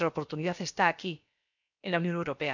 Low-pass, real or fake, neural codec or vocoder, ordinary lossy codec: 7.2 kHz; fake; codec, 16 kHz, about 1 kbps, DyCAST, with the encoder's durations; none